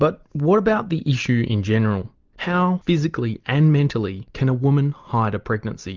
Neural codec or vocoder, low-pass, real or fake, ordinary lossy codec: none; 7.2 kHz; real; Opus, 24 kbps